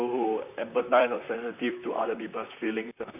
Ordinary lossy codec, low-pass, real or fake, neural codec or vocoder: none; 3.6 kHz; fake; vocoder, 44.1 kHz, 128 mel bands, Pupu-Vocoder